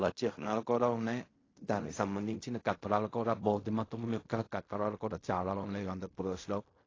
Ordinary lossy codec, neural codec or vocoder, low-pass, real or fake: AAC, 32 kbps; codec, 16 kHz in and 24 kHz out, 0.4 kbps, LongCat-Audio-Codec, fine tuned four codebook decoder; 7.2 kHz; fake